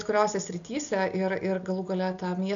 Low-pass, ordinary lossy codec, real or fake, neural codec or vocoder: 7.2 kHz; AAC, 64 kbps; real; none